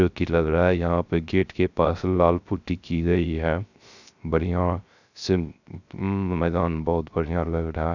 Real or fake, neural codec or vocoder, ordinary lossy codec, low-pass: fake; codec, 16 kHz, 0.3 kbps, FocalCodec; none; 7.2 kHz